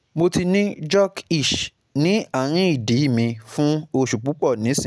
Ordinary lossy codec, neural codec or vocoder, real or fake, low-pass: none; none; real; none